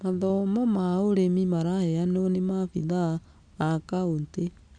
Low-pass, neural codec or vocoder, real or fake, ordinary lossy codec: 9.9 kHz; none; real; none